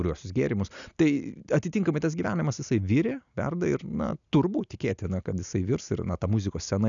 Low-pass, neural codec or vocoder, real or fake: 7.2 kHz; none; real